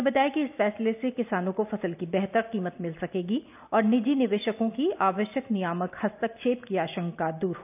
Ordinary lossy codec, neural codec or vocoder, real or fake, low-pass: none; none; real; 3.6 kHz